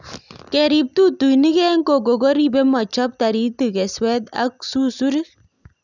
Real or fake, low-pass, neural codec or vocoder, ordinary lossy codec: real; 7.2 kHz; none; none